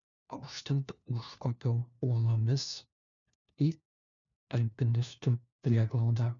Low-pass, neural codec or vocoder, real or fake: 7.2 kHz; codec, 16 kHz, 1 kbps, FunCodec, trained on LibriTTS, 50 frames a second; fake